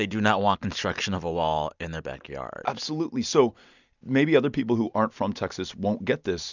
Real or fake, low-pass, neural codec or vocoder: real; 7.2 kHz; none